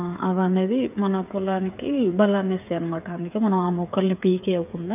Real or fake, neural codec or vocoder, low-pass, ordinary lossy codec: fake; codec, 16 kHz, 8 kbps, FreqCodec, smaller model; 3.6 kHz; none